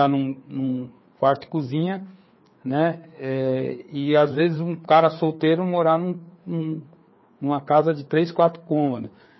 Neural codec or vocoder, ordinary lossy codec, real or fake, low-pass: codec, 16 kHz, 4 kbps, FreqCodec, larger model; MP3, 24 kbps; fake; 7.2 kHz